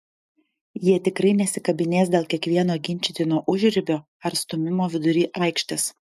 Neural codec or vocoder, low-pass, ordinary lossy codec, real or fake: none; 14.4 kHz; MP3, 96 kbps; real